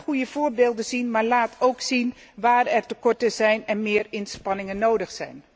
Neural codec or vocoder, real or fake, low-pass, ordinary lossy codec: none; real; none; none